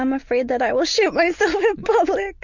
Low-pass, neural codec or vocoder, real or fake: 7.2 kHz; none; real